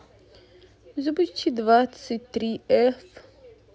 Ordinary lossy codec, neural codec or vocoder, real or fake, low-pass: none; none; real; none